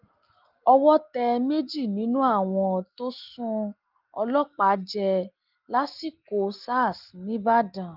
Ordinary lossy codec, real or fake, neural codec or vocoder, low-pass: Opus, 32 kbps; real; none; 5.4 kHz